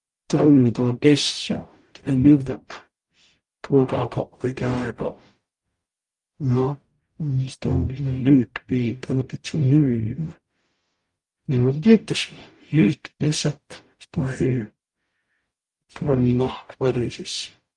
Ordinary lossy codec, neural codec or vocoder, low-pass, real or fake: Opus, 32 kbps; codec, 44.1 kHz, 0.9 kbps, DAC; 10.8 kHz; fake